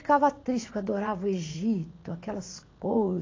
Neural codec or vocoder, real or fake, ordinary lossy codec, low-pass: vocoder, 44.1 kHz, 80 mel bands, Vocos; fake; AAC, 32 kbps; 7.2 kHz